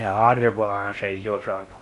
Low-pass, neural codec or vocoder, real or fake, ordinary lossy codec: 10.8 kHz; codec, 16 kHz in and 24 kHz out, 0.6 kbps, FocalCodec, streaming, 4096 codes; fake; Opus, 64 kbps